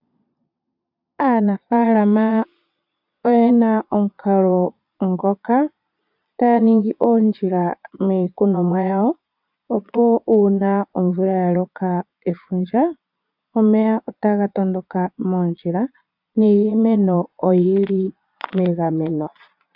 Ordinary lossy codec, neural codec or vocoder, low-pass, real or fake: AAC, 48 kbps; vocoder, 22.05 kHz, 80 mel bands, Vocos; 5.4 kHz; fake